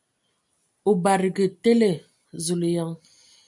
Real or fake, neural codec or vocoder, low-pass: real; none; 10.8 kHz